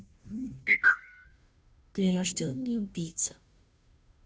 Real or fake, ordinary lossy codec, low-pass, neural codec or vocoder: fake; none; none; codec, 16 kHz, 0.5 kbps, FunCodec, trained on Chinese and English, 25 frames a second